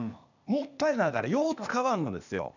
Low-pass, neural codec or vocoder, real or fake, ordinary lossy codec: 7.2 kHz; codec, 16 kHz, 0.8 kbps, ZipCodec; fake; none